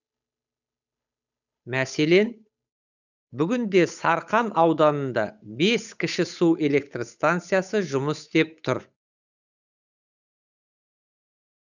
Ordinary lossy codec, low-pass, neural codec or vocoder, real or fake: none; 7.2 kHz; codec, 16 kHz, 8 kbps, FunCodec, trained on Chinese and English, 25 frames a second; fake